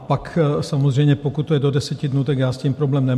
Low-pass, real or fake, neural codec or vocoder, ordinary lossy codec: 14.4 kHz; real; none; MP3, 64 kbps